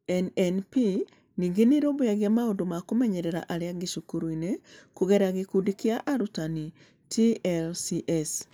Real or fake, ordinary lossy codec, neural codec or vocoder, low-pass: real; none; none; none